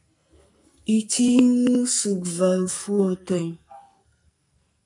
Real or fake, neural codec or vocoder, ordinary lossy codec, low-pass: fake; codec, 44.1 kHz, 2.6 kbps, SNAC; MP3, 96 kbps; 10.8 kHz